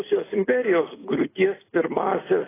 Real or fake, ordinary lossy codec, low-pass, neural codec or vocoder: real; AAC, 16 kbps; 3.6 kHz; none